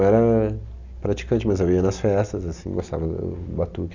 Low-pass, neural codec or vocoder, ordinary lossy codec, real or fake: 7.2 kHz; none; none; real